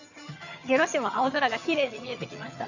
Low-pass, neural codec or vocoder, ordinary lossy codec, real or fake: 7.2 kHz; vocoder, 22.05 kHz, 80 mel bands, HiFi-GAN; none; fake